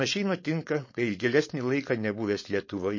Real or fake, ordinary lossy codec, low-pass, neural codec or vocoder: fake; MP3, 32 kbps; 7.2 kHz; codec, 16 kHz, 4.8 kbps, FACodec